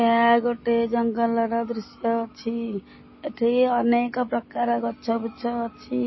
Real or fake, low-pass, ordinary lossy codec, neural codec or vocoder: real; 7.2 kHz; MP3, 24 kbps; none